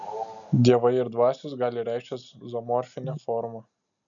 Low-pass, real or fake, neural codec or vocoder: 7.2 kHz; real; none